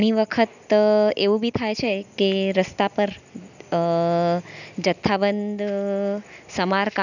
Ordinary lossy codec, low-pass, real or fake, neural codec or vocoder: none; 7.2 kHz; real; none